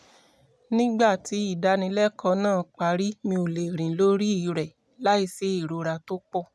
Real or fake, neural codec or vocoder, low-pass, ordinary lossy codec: real; none; none; none